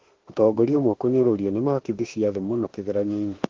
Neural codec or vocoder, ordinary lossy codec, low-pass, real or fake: autoencoder, 48 kHz, 32 numbers a frame, DAC-VAE, trained on Japanese speech; Opus, 16 kbps; 7.2 kHz; fake